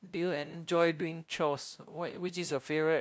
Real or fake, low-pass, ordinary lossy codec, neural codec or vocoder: fake; none; none; codec, 16 kHz, 0.5 kbps, FunCodec, trained on LibriTTS, 25 frames a second